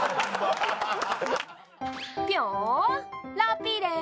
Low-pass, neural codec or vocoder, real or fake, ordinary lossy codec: none; none; real; none